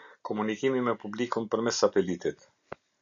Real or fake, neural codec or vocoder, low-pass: real; none; 7.2 kHz